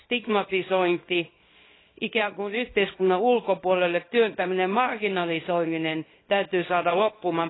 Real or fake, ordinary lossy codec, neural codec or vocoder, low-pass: fake; AAC, 16 kbps; codec, 24 kHz, 0.9 kbps, WavTokenizer, small release; 7.2 kHz